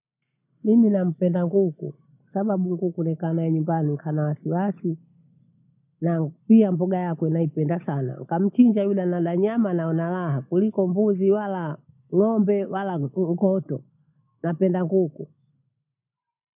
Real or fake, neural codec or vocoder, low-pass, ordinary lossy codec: real; none; 3.6 kHz; none